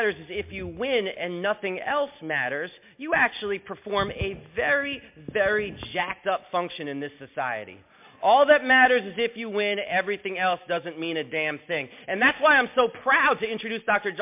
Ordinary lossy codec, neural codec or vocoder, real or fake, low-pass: MP3, 32 kbps; none; real; 3.6 kHz